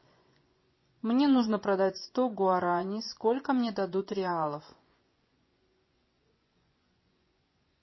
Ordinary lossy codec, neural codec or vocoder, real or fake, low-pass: MP3, 24 kbps; vocoder, 24 kHz, 100 mel bands, Vocos; fake; 7.2 kHz